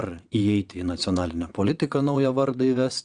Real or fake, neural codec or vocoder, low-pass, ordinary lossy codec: fake; vocoder, 22.05 kHz, 80 mel bands, WaveNeXt; 9.9 kHz; Opus, 64 kbps